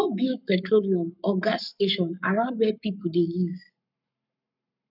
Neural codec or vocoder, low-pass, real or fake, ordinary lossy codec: codec, 44.1 kHz, 7.8 kbps, Pupu-Codec; 5.4 kHz; fake; none